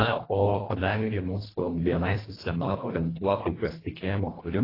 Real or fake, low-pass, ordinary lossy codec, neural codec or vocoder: fake; 5.4 kHz; AAC, 24 kbps; codec, 24 kHz, 1.5 kbps, HILCodec